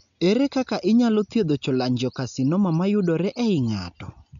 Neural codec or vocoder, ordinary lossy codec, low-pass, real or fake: none; none; 7.2 kHz; real